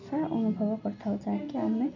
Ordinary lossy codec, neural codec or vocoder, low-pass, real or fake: none; none; 7.2 kHz; real